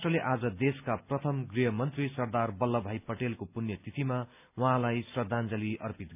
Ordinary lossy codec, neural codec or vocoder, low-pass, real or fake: none; none; 3.6 kHz; real